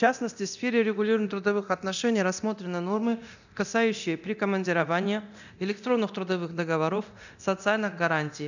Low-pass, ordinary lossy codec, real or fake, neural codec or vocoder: 7.2 kHz; none; fake; codec, 24 kHz, 0.9 kbps, DualCodec